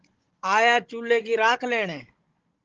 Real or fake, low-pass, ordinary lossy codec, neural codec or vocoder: fake; 7.2 kHz; Opus, 16 kbps; codec, 16 kHz, 16 kbps, FunCodec, trained on Chinese and English, 50 frames a second